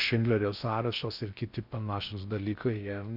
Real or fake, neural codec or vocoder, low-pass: fake; codec, 16 kHz in and 24 kHz out, 0.6 kbps, FocalCodec, streaming, 4096 codes; 5.4 kHz